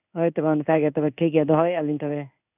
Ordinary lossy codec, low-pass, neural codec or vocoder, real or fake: none; 3.6 kHz; codec, 16 kHz in and 24 kHz out, 1 kbps, XY-Tokenizer; fake